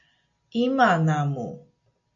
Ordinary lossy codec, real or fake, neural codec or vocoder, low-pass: AAC, 64 kbps; real; none; 7.2 kHz